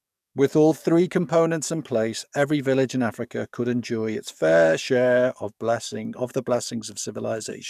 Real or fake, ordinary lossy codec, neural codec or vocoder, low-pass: fake; none; codec, 44.1 kHz, 7.8 kbps, DAC; 14.4 kHz